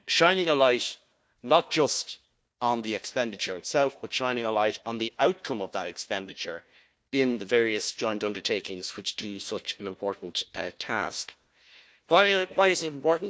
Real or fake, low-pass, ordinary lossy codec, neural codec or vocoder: fake; none; none; codec, 16 kHz, 1 kbps, FunCodec, trained on Chinese and English, 50 frames a second